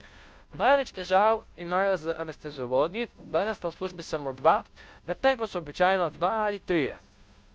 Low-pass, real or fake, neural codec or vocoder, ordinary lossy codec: none; fake; codec, 16 kHz, 0.5 kbps, FunCodec, trained on Chinese and English, 25 frames a second; none